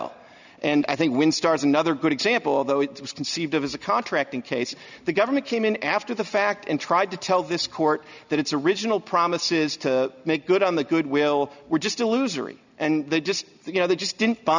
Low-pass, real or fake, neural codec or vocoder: 7.2 kHz; real; none